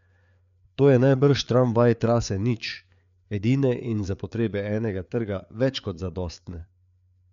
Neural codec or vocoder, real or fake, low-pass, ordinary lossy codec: codec, 16 kHz, 4 kbps, FreqCodec, larger model; fake; 7.2 kHz; MP3, 64 kbps